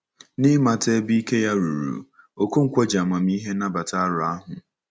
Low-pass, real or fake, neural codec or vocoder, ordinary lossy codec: none; real; none; none